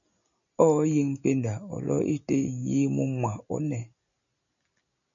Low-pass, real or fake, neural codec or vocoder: 7.2 kHz; real; none